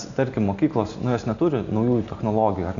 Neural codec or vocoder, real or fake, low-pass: none; real; 7.2 kHz